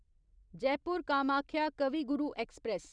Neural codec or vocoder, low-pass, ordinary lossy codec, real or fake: vocoder, 44.1 kHz, 128 mel bands every 256 samples, BigVGAN v2; 9.9 kHz; none; fake